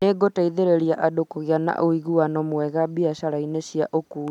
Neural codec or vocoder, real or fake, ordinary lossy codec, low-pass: none; real; none; 19.8 kHz